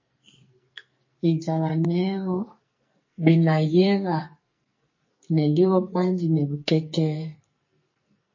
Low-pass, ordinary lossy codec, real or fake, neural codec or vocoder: 7.2 kHz; MP3, 32 kbps; fake; codec, 44.1 kHz, 2.6 kbps, SNAC